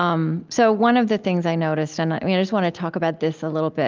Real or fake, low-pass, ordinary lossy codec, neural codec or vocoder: real; 7.2 kHz; Opus, 24 kbps; none